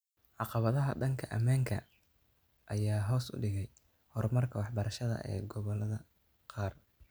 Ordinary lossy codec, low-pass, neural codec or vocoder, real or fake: none; none; none; real